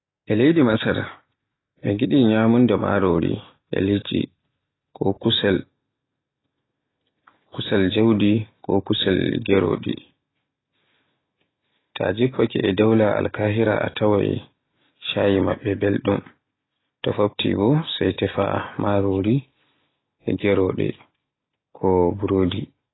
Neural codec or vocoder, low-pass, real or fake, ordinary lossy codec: none; 7.2 kHz; real; AAC, 16 kbps